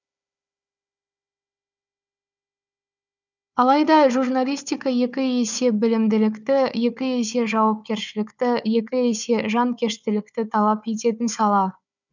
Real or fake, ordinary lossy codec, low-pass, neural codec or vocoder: fake; none; 7.2 kHz; codec, 16 kHz, 4 kbps, FunCodec, trained on Chinese and English, 50 frames a second